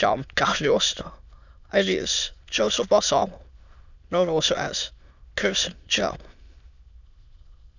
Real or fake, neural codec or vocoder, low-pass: fake; autoencoder, 22.05 kHz, a latent of 192 numbers a frame, VITS, trained on many speakers; 7.2 kHz